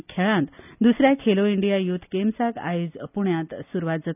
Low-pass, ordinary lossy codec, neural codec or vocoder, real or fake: 3.6 kHz; none; none; real